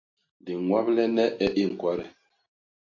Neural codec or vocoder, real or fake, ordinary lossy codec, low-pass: none; real; AAC, 48 kbps; 7.2 kHz